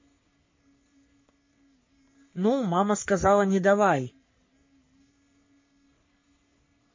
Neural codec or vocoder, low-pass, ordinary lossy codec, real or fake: codec, 44.1 kHz, 3.4 kbps, Pupu-Codec; 7.2 kHz; MP3, 32 kbps; fake